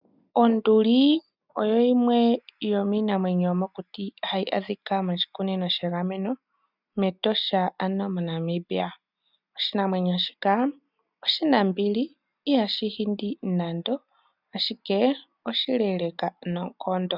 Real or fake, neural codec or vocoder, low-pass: real; none; 5.4 kHz